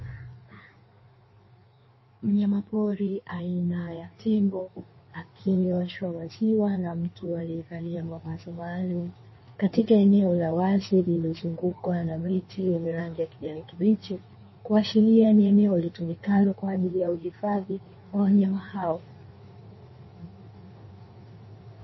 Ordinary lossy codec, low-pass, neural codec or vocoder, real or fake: MP3, 24 kbps; 7.2 kHz; codec, 16 kHz in and 24 kHz out, 1.1 kbps, FireRedTTS-2 codec; fake